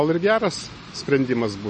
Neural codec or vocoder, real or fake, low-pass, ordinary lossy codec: none; real; 10.8 kHz; MP3, 32 kbps